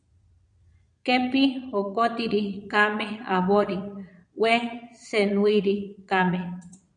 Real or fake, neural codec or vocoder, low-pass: fake; vocoder, 22.05 kHz, 80 mel bands, Vocos; 9.9 kHz